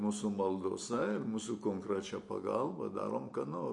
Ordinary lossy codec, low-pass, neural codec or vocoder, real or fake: MP3, 48 kbps; 10.8 kHz; none; real